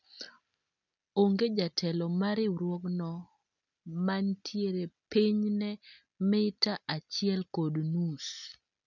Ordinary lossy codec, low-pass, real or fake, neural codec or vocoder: none; 7.2 kHz; real; none